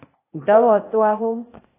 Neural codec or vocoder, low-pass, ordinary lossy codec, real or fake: codec, 16 kHz, 0.8 kbps, ZipCodec; 3.6 kHz; AAC, 24 kbps; fake